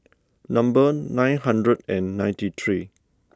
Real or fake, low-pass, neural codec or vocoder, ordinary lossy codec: real; none; none; none